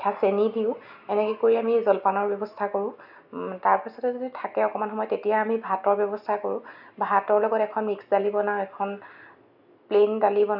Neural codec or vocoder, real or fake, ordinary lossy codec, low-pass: none; real; none; 5.4 kHz